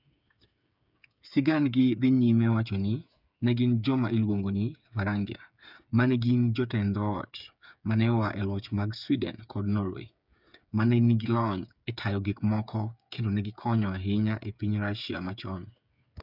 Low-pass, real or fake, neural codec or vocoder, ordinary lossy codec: 5.4 kHz; fake; codec, 16 kHz, 8 kbps, FreqCodec, smaller model; none